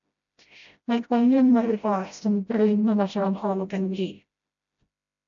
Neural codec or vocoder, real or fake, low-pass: codec, 16 kHz, 0.5 kbps, FreqCodec, smaller model; fake; 7.2 kHz